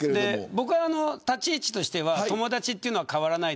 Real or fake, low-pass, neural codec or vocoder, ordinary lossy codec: real; none; none; none